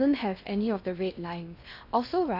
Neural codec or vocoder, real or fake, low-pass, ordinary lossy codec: codec, 16 kHz in and 24 kHz out, 0.8 kbps, FocalCodec, streaming, 65536 codes; fake; 5.4 kHz; none